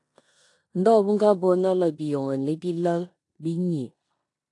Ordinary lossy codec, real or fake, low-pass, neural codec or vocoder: AAC, 48 kbps; fake; 10.8 kHz; codec, 16 kHz in and 24 kHz out, 0.9 kbps, LongCat-Audio-Codec, four codebook decoder